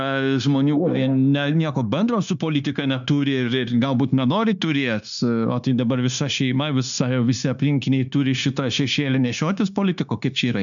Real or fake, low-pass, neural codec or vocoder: fake; 7.2 kHz; codec, 16 kHz, 0.9 kbps, LongCat-Audio-Codec